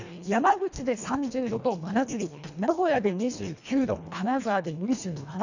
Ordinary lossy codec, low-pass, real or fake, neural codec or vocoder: none; 7.2 kHz; fake; codec, 24 kHz, 1.5 kbps, HILCodec